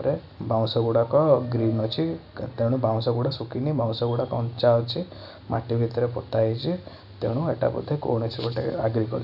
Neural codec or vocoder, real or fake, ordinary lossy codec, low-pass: none; real; none; 5.4 kHz